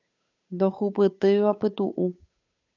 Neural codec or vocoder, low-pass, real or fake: codec, 16 kHz, 8 kbps, FunCodec, trained on Chinese and English, 25 frames a second; 7.2 kHz; fake